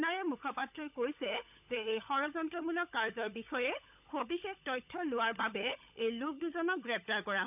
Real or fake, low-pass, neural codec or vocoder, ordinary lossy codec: fake; 3.6 kHz; codec, 16 kHz, 16 kbps, FunCodec, trained on LibriTTS, 50 frames a second; none